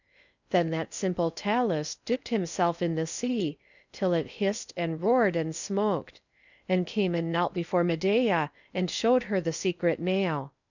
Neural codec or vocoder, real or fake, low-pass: codec, 16 kHz in and 24 kHz out, 0.6 kbps, FocalCodec, streaming, 4096 codes; fake; 7.2 kHz